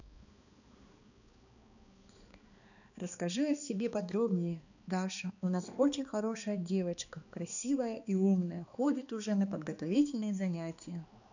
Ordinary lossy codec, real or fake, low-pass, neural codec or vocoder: none; fake; 7.2 kHz; codec, 16 kHz, 2 kbps, X-Codec, HuBERT features, trained on balanced general audio